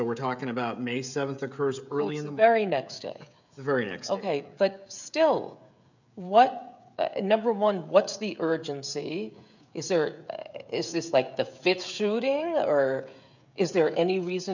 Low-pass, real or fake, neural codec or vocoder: 7.2 kHz; fake; codec, 16 kHz, 16 kbps, FreqCodec, smaller model